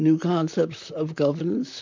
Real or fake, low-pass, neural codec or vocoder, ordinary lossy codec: fake; 7.2 kHz; vocoder, 44.1 kHz, 128 mel bands, Pupu-Vocoder; MP3, 64 kbps